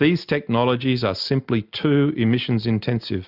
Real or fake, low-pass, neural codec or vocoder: real; 5.4 kHz; none